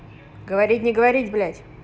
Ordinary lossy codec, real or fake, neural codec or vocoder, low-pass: none; real; none; none